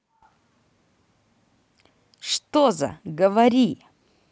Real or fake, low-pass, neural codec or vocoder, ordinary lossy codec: real; none; none; none